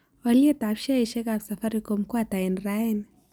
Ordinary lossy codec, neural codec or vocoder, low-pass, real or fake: none; none; none; real